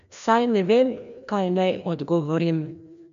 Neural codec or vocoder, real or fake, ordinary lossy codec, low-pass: codec, 16 kHz, 1 kbps, FreqCodec, larger model; fake; none; 7.2 kHz